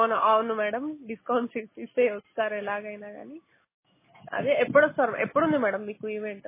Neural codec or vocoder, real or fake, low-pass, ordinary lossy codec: none; real; 3.6 kHz; MP3, 16 kbps